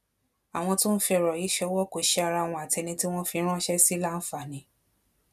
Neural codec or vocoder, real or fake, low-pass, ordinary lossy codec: none; real; 14.4 kHz; none